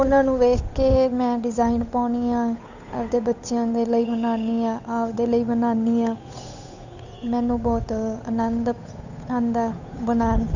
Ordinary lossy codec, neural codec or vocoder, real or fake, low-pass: none; codec, 16 kHz, 8 kbps, FunCodec, trained on Chinese and English, 25 frames a second; fake; 7.2 kHz